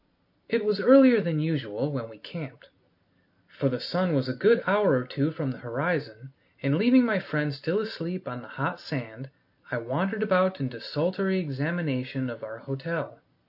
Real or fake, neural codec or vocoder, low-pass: real; none; 5.4 kHz